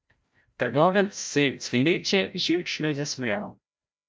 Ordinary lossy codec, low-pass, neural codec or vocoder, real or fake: none; none; codec, 16 kHz, 0.5 kbps, FreqCodec, larger model; fake